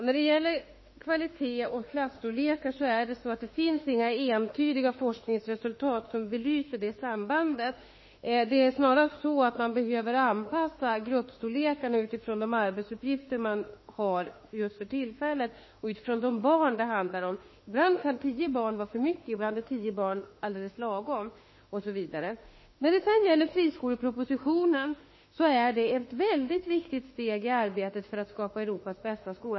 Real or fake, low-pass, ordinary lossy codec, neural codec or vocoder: fake; 7.2 kHz; MP3, 24 kbps; autoencoder, 48 kHz, 32 numbers a frame, DAC-VAE, trained on Japanese speech